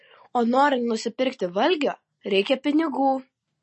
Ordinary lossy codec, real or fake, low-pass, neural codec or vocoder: MP3, 32 kbps; real; 9.9 kHz; none